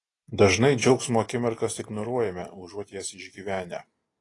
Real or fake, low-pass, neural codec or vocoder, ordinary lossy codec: real; 10.8 kHz; none; AAC, 32 kbps